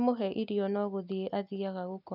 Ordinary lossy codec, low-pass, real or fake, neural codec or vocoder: none; 5.4 kHz; real; none